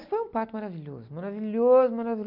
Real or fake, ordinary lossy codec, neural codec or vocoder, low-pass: real; none; none; 5.4 kHz